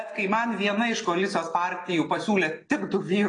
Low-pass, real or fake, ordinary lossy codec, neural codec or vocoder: 9.9 kHz; real; AAC, 32 kbps; none